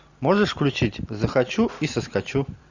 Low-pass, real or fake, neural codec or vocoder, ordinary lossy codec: 7.2 kHz; real; none; Opus, 64 kbps